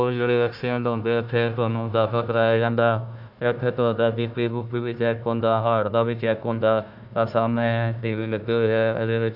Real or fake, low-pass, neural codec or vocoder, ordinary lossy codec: fake; 5.4 kHz; codec, 16 kHz, 1 kbps, FunCodec, trained on Chinese and English, 50 frames a second; none